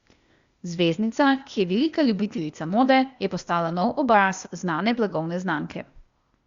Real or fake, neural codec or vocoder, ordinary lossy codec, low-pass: fake; codec, 16 kHz, 0.8 kbps, ZipCodec; Opus, 64 kbps; 7.2 kHz